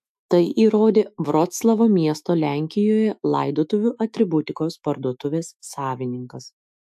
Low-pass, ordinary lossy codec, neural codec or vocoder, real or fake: 14.4 kHz; AAC, 96 kbps; autoencoder, 48 kHz, 128 numbers a frame, DAC-VAE, trained on Japanese speech; fake